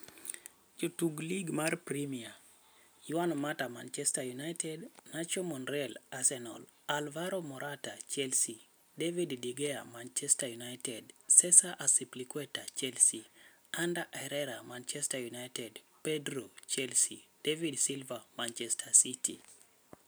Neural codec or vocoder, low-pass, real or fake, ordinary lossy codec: none; none; real; none